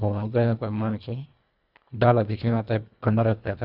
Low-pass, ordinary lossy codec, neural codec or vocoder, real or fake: 5.4 kHz; Opus, 64 kbps; codec, 24 kHz, 1.5 kbps, HILCodec; fake